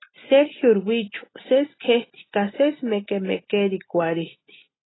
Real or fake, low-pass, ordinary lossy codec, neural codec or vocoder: real; 7.2 kHz; AAC, 16 kbps; none